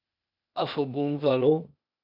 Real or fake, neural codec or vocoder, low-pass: fake; codec, 16 kHz, 0.8 kbps, ZipCodec; 5.4 kHz